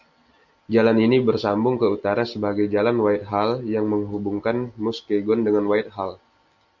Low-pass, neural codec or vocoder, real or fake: 7.2 kHz; none; real